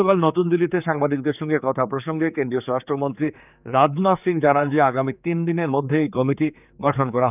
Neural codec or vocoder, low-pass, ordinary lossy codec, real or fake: codec, 16 kHz, 4 kbps, X-Codec, HuBERT features, trained on general audio; 3.6 kHz; none; fake